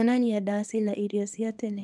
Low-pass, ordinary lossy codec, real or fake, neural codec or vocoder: none; none; fake; codec, 24 kHz, 0.9 kbps, WavTokenizer, small release